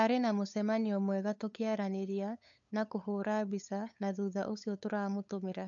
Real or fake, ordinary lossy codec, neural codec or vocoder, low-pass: fake; MP3, 64 kbps; codec, 16 kHz, 8 kbps, FunCodec, trained on LibriTTS, 25 frames a second; 7.2 kHz